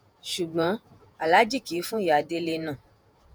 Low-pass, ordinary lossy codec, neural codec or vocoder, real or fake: none; none; vocoder, 48 kHz, 128 mel bands, Vocos; fake